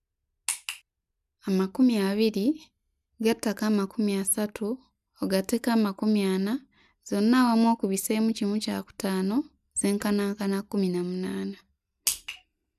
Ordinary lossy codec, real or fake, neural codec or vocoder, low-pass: none; real; none; 14.4 kHz